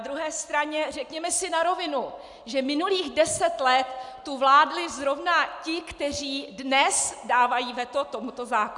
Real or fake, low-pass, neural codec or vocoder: real; 10.8 kHz; none